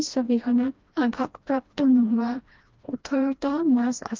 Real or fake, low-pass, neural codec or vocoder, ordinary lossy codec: fake; 7.2 kHz; codec, 16 kHz, 1 kbps, FreqCodec, smaller model; Opus, 32 kbps